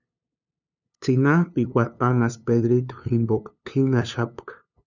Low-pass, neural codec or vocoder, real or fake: 7.2 kHz; codec, 16 kHz, 2 kbps, FunCodec, trained on LibriTTS, 25 frames a second; fake